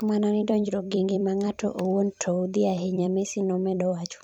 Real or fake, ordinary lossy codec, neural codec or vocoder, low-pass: fake; none; vocoder, 44.1 kHz, 128 mel bands every 256 samples, BigVGAN v2; 19.8 kHz